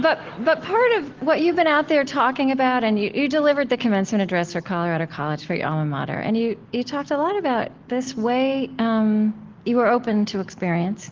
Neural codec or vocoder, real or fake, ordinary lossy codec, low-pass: none; real; Opus, 16 kbps; 7.2 kHz